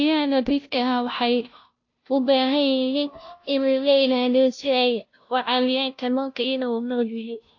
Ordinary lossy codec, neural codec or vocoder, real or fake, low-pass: AAC, 48 kbps; codec, 16 kHz, 0.5 kbps, FunCodec, trained on Chinese and English, 25 frames a second; fake; 7.2 kHz